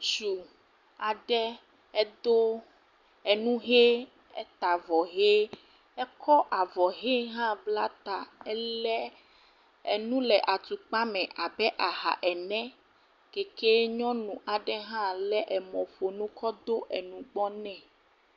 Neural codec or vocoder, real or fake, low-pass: none; real; 7.2 kHz